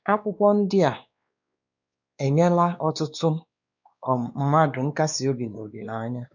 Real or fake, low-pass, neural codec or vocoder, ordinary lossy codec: fake; 7.2 kHz; codec, 16 kHz, 2 kbps, X-Codec, WavLM features, trained on Multilingual LibriSpeech; none